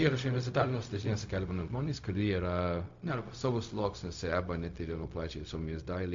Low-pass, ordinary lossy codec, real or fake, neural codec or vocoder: 7.2 kHz; MP3, 48 kbps; fake; codec, 16 kHz, 0.4 kbps, LongCat-Audio-Codec